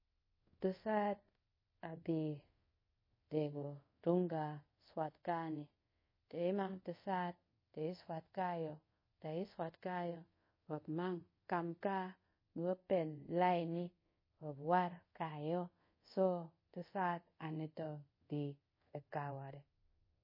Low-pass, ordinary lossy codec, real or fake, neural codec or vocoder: 5.4 kHz; MP3, 24 kbps; fake; codec, 24 kHz, 0.5 kbps, DualCodec